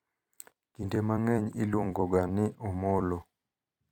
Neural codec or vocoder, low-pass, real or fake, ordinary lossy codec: vocoder, 44.1 kHz, 128 mel bands every 256 samples, BigVGAN v2; 19.8 kHz; fake; none